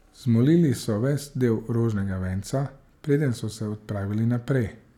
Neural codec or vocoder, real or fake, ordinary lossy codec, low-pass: none; real; none; 19.8 kHz